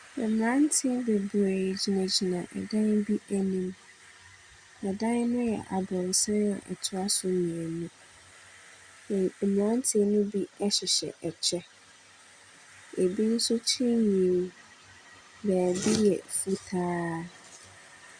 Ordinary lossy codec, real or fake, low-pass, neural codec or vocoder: Opus, 64 kbps; real; 9.9 kHz; none